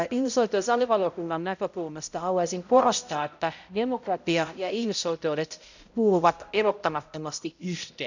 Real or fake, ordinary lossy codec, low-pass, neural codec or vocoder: fake; none; 7.2 kHz; codec, 16 kHz, 0.5 kbps, X-Codec, HuBERT features, trained on balanced general audio